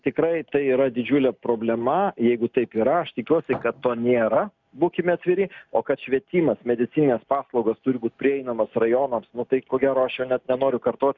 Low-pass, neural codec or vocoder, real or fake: 7.2 kHz; none; real